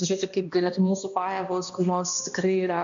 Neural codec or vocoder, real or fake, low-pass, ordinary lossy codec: codec, 16 kHz, 1 kbps, X-Codec, HuBERT features, trained on balanced general audio; fake; 7.2 kHz; MP3, 64 kbps